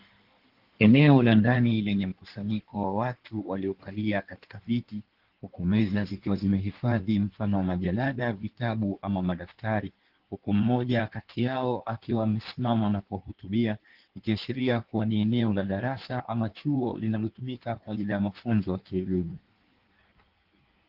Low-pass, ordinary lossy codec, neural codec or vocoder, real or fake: 5.4 kHz; Opus, 16 kbps; codec, 16 kHz in and 24 kHz out, 1.1 kbps, FireRedTTS-2 codec; fake